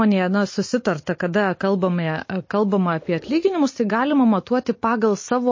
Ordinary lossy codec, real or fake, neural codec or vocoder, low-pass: MP3, 32 kbps; real; none; 7.2 kHz